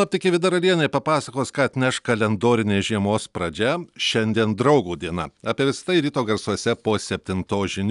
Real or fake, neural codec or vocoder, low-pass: real; none; 10.8 kHz